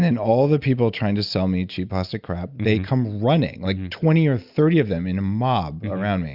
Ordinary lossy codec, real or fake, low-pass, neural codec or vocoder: Opus, 64 kbps; real; 5.4 kHz; none